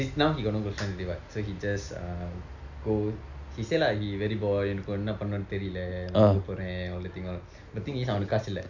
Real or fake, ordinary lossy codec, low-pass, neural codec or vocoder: real; none; 7.2 kHz; none